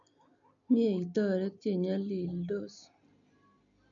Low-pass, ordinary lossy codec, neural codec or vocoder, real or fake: 7.2 kHz; none; none; real